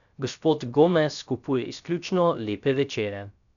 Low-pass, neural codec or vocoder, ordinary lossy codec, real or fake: 7.2 kHz; codec, 16 kHz, 0.3 kbps, FocalCodec; none; fake